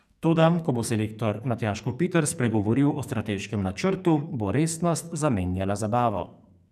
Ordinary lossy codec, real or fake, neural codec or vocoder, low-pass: none; fake; codec, 44.1 kHz, 2.6 kbps, SNAC; 14.4 kHz